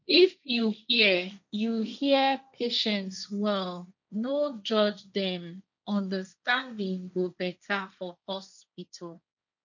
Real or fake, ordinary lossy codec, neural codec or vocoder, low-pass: fake; none; codec, 16 kHz, 1.1 kbps, Voila-Tokenizer; 7.2 kHz